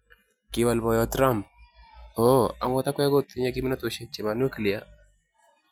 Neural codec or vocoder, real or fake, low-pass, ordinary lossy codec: none; real; none; none